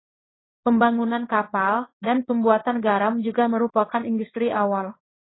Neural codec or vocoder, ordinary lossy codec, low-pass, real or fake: codec, 16 kHz in and 24 kHz out, 1 kbps, XY-Tokenizer; AAC, 16 kbps; 7.2 kHz; fake